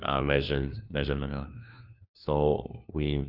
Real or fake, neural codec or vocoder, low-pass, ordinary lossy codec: fake; codec, 16 kHz, 1 kbps, FunCodec, trained on LibriTTS, 50 frames a second; 5.4 kHz; none